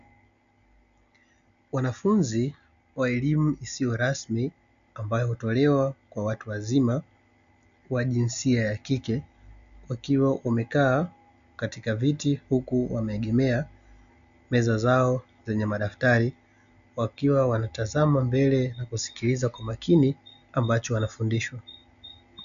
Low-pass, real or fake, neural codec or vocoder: 7.2 kHz; real; none